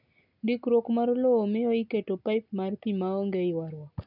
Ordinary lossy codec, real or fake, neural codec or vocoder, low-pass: none; real; none; 5.4 kHz